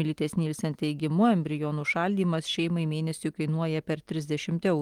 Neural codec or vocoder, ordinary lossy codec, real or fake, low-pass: vocoder, 44.1 kHz, 128 mel bands every 256 samples, BigVGAN v2; Opus, 24 kbps; fake; 19.8 kHz